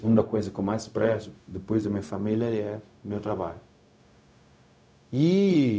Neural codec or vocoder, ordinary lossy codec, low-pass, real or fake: codec, 16 kHz, 0.4 kbps, LongCat-Audio-Codec; none; none; fake